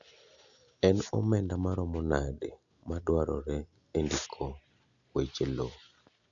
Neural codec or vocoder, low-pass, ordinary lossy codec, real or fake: none; 7.2 kHz; none; real